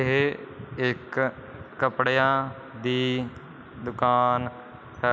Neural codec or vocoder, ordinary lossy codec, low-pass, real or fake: none; none; none; real